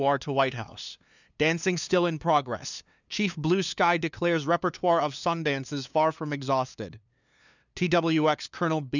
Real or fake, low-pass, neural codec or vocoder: fake; 7.2 kHz; codec, 16 kHz, 2 kbps, FunCodec, trained on LibriTTS, 25 frames a second